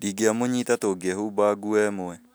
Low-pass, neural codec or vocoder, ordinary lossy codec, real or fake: none; none; none; real